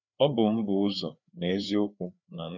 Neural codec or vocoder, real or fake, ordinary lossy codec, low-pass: codec, 16 kHz, 4 kbps, FreqCodec, larger model; fake; none; 7.2 kHz